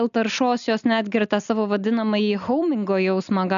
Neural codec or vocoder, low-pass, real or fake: none; 7.2 kHz; real